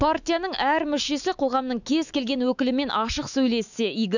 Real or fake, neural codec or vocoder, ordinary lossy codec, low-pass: fake; autoencoder, 48 kHz, 128 numbers a frame, DAC-VAE, trained on Japanese speech; none; 7.2 kHz